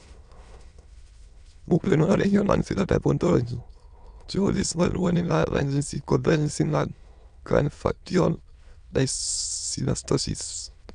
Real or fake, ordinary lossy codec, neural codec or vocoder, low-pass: fake; none; autoencoder, 22.05 kHz, a latent of 192 numbers a frame, VITS, trained on many speakers; 9.9 kHz